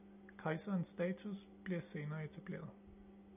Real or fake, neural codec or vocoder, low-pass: real; none; 3.6 kHz